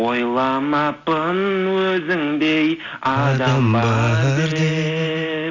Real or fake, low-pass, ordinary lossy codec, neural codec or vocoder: real; 7.2 kHz; none; none